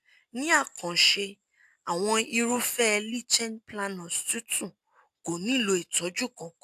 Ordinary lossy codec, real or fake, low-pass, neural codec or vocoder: AAC, 96 kbps; real; 14.4 kHz; none